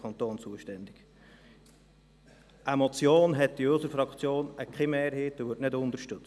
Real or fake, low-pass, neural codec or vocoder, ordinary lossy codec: real; none; none; none